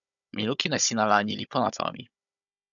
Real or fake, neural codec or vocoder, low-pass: fake; codec, 16 kHz, 16 kbps, FunCodec, trained on Chinese and English, 50 frames a second; 7.2 kHz